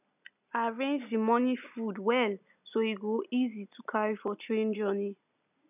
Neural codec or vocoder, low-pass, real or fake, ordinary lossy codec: none; 3.6 kHz; real; none